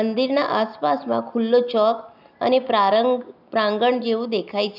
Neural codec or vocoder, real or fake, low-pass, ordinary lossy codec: none; real; 5.4 kHz; none